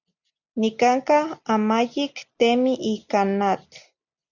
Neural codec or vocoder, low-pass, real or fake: none; 7.2 kHz; real